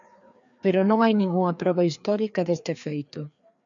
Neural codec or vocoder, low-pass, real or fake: codec, 16 kHz, 2 kbps, FreqCodec, larger model; 7.2 kHz; fake